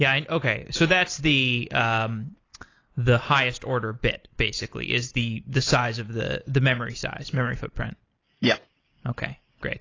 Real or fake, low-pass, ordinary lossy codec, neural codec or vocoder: real; 7.2 kHz; AAC, 32 kbps; none